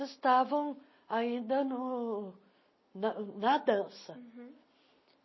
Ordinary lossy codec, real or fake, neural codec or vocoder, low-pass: MP3, 24 kbps; real; none; 7.2 kHz